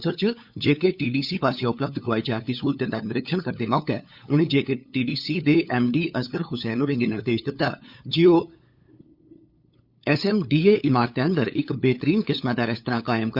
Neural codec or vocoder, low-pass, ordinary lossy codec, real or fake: codec, 16 kHz, 8 kbps, FunCodec, trained on LibriTTS, 25 frames a second; 5.4 kHz; Opus, 64 kbps; fake